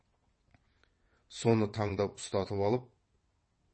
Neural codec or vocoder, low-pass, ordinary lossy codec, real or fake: vocoder, 22.05 kHz, 80 mel bands, WaveNeXt; 9.9 kHz; MP3, 32 kbps; fake